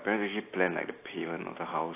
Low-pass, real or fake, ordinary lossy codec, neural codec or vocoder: 3.6 kHz; real; AAC, 24 kbps; none